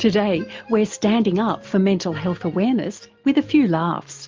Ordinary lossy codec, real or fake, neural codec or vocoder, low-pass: Opus, 24 kbps; real; none; 7.2 kHz